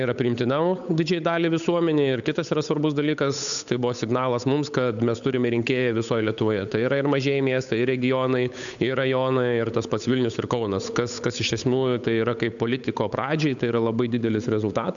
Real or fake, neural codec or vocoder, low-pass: fake; codec, 16 kHz, 8 kbps, FunCodec, trained on Chinese and English, 25 frames a second; 7.2 kHz